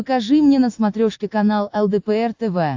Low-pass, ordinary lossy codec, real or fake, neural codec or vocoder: 7.2 kHz; AAC, 48 kbps; real; none